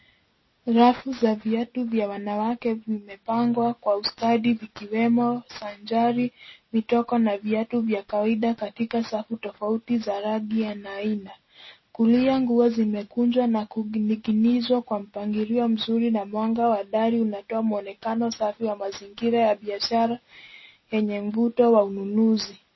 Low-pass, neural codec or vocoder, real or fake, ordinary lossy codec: 7.2 kHz; none; real; MP3, 24 kbps